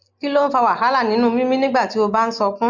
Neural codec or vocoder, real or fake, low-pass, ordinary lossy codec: none; real; 7.2 kHz; none